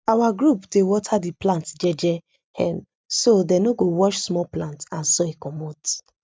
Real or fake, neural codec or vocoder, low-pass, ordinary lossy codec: real; none; none; none